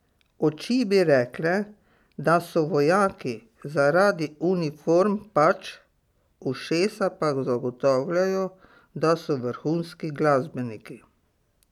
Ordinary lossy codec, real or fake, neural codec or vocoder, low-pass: none; real; none; 19.8 kHz